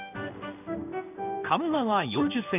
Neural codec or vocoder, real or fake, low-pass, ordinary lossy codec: codec, 16 kHz in and 24 kHz out, 1 kbps, XY-Tokenizer; fake; 3.6 kHz; none